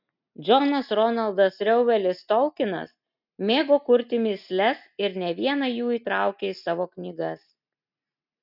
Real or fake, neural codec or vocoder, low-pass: real; none; 5.4 kHz